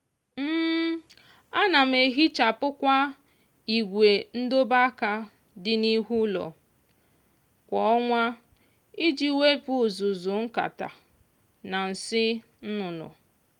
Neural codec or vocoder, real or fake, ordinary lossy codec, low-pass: none; real; Opus, 32 kbps; 19.8 kHz